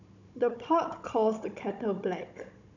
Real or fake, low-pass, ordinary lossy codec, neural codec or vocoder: fake; 7.2 kHz; none; codec, 16 kHz, 16 kbps, FunCodec, trained on Chinese and English, 50 frames a second